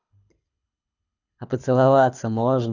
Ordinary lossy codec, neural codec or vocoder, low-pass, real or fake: none; codec, 24 kHz, 6 kbps, HILCodec; 7.2 kHz; fake